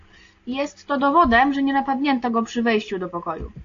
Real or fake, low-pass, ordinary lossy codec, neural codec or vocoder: real; 7.2 kHz; AAC, 48 kbps; none